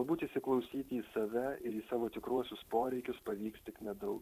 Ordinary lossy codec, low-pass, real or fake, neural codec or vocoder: Opus, 64 kbps; 14.4 kHz; fake; vocoder, 44.1 kHz, 128 mel bands every 512 samples, BigVGAN v2